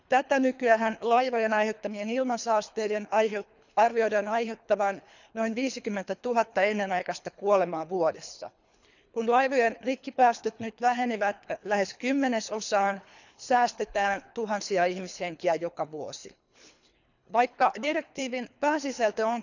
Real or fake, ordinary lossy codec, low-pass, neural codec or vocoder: fake; none; 7.2 kHz; codec, 24 kHz, 3 kbps, HILCodec